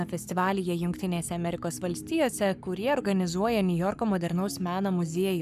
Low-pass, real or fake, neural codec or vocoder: 14.4 kHz; fake; codec, 44.1 kHz, 7.8 kbps, Pupu-Codec